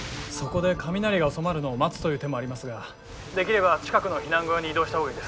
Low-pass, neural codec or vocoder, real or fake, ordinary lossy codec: none; none; real; none